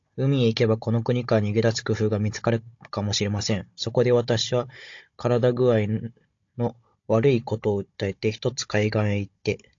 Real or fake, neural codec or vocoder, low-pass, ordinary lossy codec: fake; codec, 16 kHz, 16 kbps, FunCodec, trained on Chinese and English, 50 frames a second; 7.2 kHz; AAC, 48 kbps